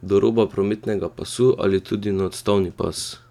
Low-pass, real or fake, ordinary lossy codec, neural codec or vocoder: 19.8 kHz; real; none; none